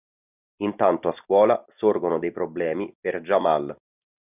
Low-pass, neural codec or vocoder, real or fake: 3.6 kHz; none; real